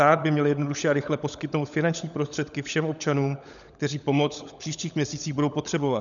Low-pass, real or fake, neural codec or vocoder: 7.2 kHz; fake; codec, 16 kHz, 16 kbps, FunCodec, trained on LibriTTS, 50 frames a second